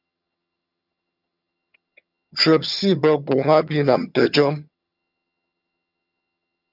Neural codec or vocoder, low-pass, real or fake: vocoder, 22.05 kHz, 80 mel bands, HiFi-GAN; 5.4 kHz; fake